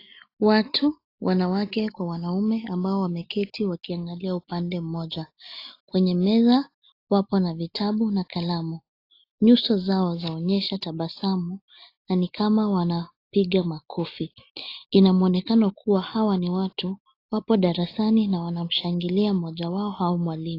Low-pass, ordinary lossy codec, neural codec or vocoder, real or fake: 5.4 kHz; AAC, 32 kbps; none; real